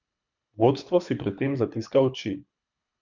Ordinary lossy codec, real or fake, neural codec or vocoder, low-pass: none; fake; codec, 24 kHz, 3 kbps, HILCodec; 7.2 kHz